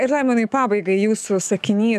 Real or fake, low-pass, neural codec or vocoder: fake; 14.4 kHz; autoencoder, 48 kHz, 128 numbers a frame, DAC-VAE, trained on Japanese speech